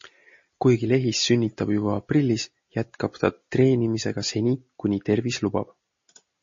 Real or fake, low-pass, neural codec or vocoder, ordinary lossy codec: real; 7.2 kHz; none; MP3, 32 kbps